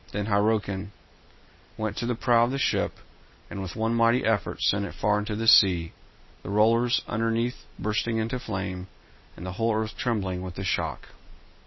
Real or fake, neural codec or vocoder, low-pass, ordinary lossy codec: real; none; 7.2 kHz; MP3, 24 kbps